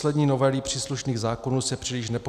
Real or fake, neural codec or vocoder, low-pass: real; none; 14.4 kHz